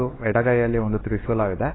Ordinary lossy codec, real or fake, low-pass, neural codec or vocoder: AAC, 16 kbps; fake; 7.2 kHz; codec, 16 kHz, 2 kbps, X-Codec, WavLM features, trained on Multilingual LibriSpeech